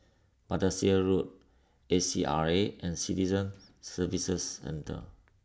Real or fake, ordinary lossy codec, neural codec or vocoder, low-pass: real; none; none; none